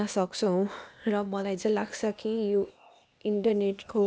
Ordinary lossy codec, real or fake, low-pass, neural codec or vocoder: none; fake; none; codec, 16 kHz, 0.8 kbps, ZipCodec